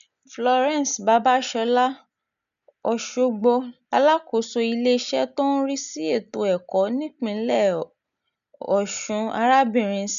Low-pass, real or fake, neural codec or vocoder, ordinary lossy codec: 7.2 kHz; real; none; none